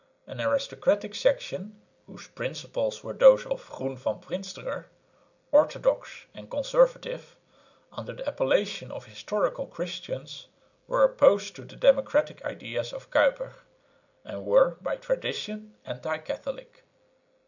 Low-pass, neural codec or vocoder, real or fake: 7.2 kHz; none; real